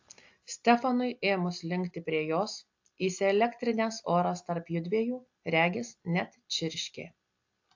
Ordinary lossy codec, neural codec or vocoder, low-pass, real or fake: AAC, 48 kbps; none; 7.2 kHz; real